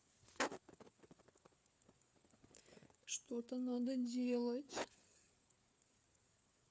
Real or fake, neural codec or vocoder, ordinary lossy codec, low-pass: fake; codec, 16 kHz, 4 kbps, FreqCodec, larger model; none; none